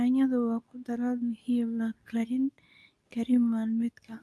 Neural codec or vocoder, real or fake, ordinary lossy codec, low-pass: codec, 24 kHz, 0.9 kbps, WavTokenizer, medium speech release version 2; fake; none; none